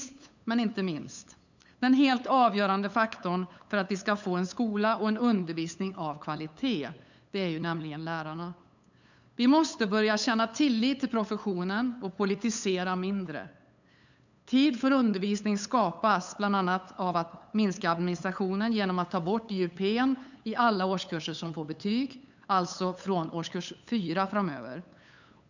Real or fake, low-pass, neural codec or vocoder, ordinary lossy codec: fake; 7.2 kHz; codec, 16 kHz, 8 kbps, FunCodec, trained on LibriTTS, 25 frames a second; none